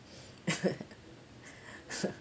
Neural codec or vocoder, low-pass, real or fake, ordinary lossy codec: none; none; real; none